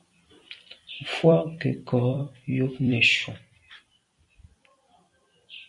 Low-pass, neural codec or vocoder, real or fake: 10.8 kHz; vocoder, 44.1 kHz, 128 mel bands every 256 samples, BigVGAN v2; fake